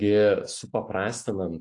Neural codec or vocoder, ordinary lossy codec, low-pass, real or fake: none; AAC, 48 kbps; 10.8 kHz; real